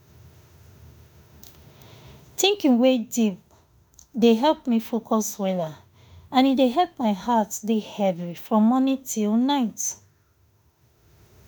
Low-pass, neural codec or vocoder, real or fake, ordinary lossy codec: none; autoencoder, 48 kHz, 32 numbers a frame, DAC-VAE, trained on Japanese speech; fake; none